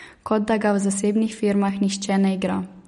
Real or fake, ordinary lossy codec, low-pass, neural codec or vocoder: real; MP3, 48 kbps; 19.8 kHz; none